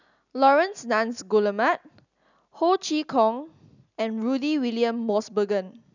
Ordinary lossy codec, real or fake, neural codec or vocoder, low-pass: none; real; none; 7.2 kHz